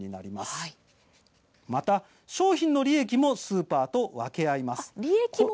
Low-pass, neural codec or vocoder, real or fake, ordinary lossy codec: none; none; real; none